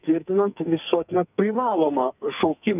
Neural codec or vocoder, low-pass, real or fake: codec, 44.1 kHz, 2.6 kbps, SNAC; 3.6 kHz; fake